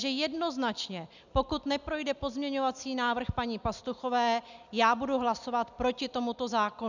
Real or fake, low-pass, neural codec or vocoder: real; 7.2 kHz; none